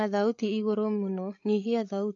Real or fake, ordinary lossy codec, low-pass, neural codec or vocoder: fake; AAC, 48 kbps; 7.2 kHz; codec, 16 kHz, 8 kbps, FreqCodec, larger model